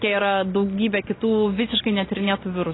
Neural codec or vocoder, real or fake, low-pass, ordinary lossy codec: none; real; 7.2 kHz; AAC, 16 kbps